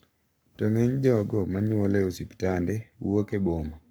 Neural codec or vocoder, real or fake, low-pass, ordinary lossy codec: codec, 44.1 kHz, 7.8 kbps, Pupu-Codec; fake; none; none